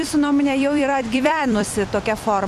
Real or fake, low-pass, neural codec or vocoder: fake; 14.4 kHz; vocoder, 44.1 kHz, 128 mel bands every 256 samples, BigVGAN v2